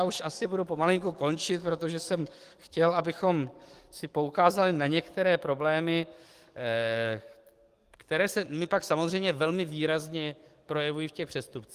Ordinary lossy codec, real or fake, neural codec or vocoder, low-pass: Opus, 24 kbps; fake; codec, 44.1 kHz, 7.8 kbps, DAC; 14.4 kHz